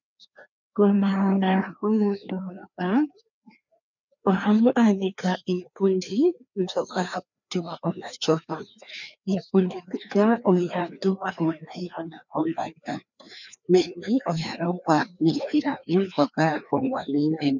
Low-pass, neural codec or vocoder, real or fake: 7.2 kHz; codec, 16 kHz, 2 kbps, FreqCodec, larger model; fake